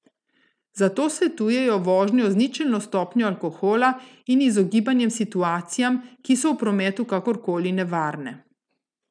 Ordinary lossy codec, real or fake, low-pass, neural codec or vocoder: none; real; 9.9 kHz; none